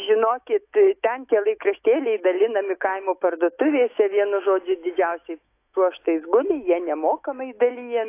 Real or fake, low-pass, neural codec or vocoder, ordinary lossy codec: fake; 3.6 kHz; autoencoder, 48 kHz, 128 numbers a frame, DAC-VAE, trained on Japanese speech; AAC, 24 kbps